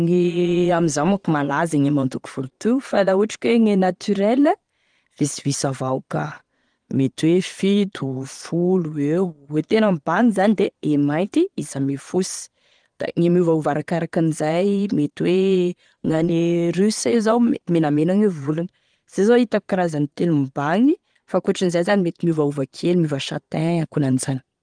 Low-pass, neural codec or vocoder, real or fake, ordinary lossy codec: 9.9 kHz; vocoder, 22.05 kHz, 80 mel bands, Vocos; fake; Opus, 24 kbps